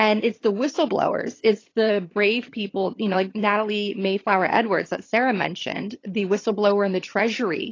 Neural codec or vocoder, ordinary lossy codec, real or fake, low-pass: vocoder, 22.05 kHz, 80 mel bands, HiFi-GAN; AAC, 32 kbps; fake; 7.2 kHz